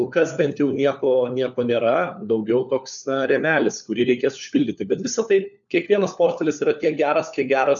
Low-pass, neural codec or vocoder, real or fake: 7.2 kHz; codec, 16 kHz, 4 kbps, FunCodec, trained on LibriTTS, 50 frames a second; fake